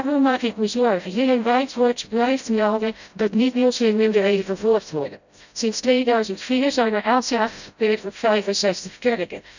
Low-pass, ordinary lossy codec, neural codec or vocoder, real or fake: 7.2 kHz; none; codec, 16 kHz, 0.5 kbps, FreqCodec, smaller model; fake